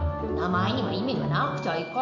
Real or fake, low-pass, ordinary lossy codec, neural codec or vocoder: real; 7.2 kHz; none; none